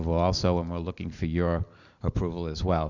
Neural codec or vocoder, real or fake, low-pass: none; real; 7.2 kHz